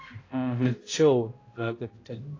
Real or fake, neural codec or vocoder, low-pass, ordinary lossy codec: fake; codec, 16 kHz, 0.5 kbps, X-Codec, HuBERT features, trained on balanced general audio; 7.2 kHz; AAC, 32 kbps